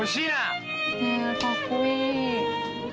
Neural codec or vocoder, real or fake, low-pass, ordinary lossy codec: none; real; none; none